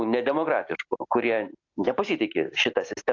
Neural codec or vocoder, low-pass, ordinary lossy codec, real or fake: none; 7.2 kHz; AAC, 48 kbps; real